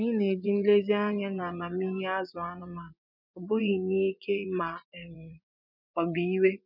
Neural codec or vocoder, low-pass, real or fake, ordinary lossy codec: vocoder, 24 kHz, 100 mel bands, Vocos; 5.4 kHz; fake; none